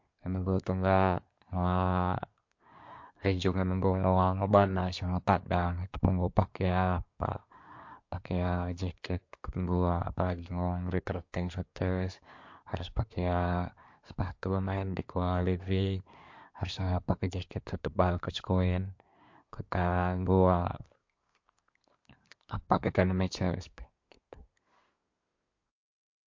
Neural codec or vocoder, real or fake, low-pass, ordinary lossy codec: codec, 24 kHz, 1 kbps, SNAC; fake; 7.2 kHz; MP3, 48 kbps